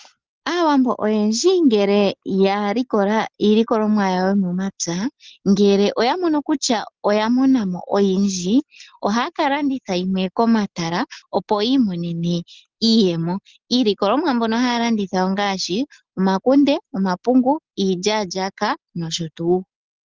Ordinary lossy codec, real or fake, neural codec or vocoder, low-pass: Opus, 16 kbps; real; none; 7.2 kHz